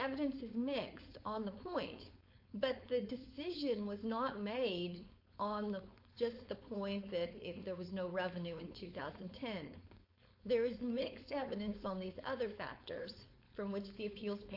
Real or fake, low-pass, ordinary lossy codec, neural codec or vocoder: fake; 5.4 kHz; MP3, 32 kbps; codec, 16 kHz, 4.8 kbps, FACodec